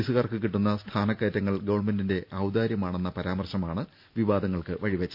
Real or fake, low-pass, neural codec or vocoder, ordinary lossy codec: real; 5.4 kHz; none; none